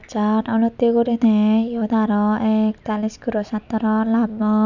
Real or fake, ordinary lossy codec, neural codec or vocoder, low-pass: real; none; none; 7.2 kHz